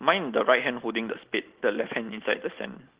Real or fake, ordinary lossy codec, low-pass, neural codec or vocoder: real; Opus, 16 kbps; 3.6 kHz; none